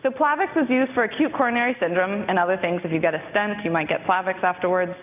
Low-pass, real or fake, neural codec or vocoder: 3.6 kHz; real; none